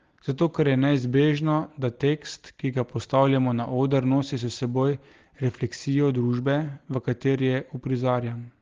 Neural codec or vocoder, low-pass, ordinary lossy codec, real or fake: none; 7.2 kHz; Opus, 16 kbps; real